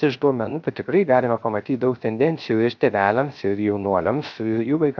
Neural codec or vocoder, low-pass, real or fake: codec, 16 kHz, 0.3 kbps, FocalCodec; 7.2 kHz; fake